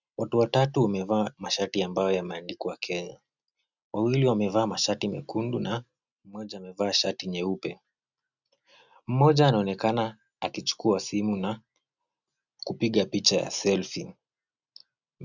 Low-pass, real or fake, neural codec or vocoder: 7.2 kHz; real; none